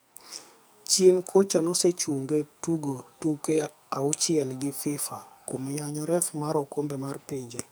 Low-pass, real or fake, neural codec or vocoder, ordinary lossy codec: none; fake; codec, 44.1 kHz, 2.6 kbps, SNAC; none